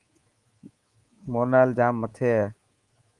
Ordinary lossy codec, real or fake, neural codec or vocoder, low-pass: Opus, 24 kbps; fake; codec, 24 kHz, 3.1 kbps, DualCodec; 10.8 kHz